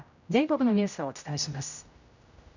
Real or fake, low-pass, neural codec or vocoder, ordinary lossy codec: fake; 7.2 kHz; codec, 16 kHz, 0.5 kbps, X-Codec, HuBERT features, trained on general audio; none